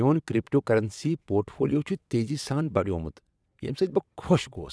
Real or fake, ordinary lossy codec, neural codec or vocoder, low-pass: fake; none; vocoder, 22.05 kHz, 80 mel bands, WaveNeXt; none